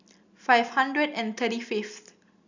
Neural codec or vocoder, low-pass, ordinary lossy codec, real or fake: none; 7.2 kHz; none; real